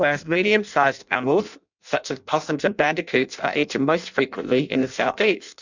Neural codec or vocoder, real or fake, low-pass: codec, 16 kHz in and 24 kHz out, 0.6 kbps, FireRedTTS-2 codec; fake; 7.2 kHz